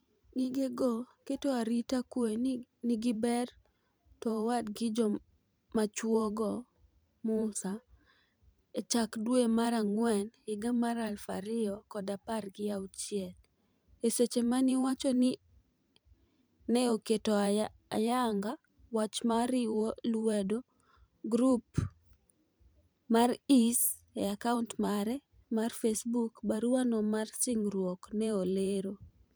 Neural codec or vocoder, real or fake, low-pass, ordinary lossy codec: vocoder, 44.1 kHz, 128 mel bands every 512 samples, BigVGAN v2; fake; none; none